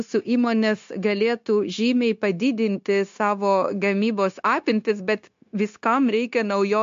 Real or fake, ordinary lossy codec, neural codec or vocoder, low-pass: fake; MP3, 48 kbps; codec, 16 kHz, 0.9 kbps, LongCat-Audio-Codec; 7.2 kHz